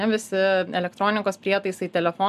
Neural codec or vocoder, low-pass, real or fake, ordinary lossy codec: none; 14.4 kHz; real; MP3, 96 kbps